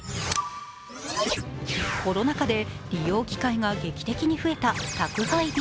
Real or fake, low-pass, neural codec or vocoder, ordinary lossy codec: real; none; none; none